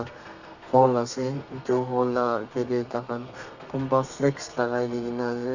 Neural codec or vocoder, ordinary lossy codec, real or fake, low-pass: codec, 32 kHz, 1.9 kbps, SNAC; none; fake; 7.2 kHz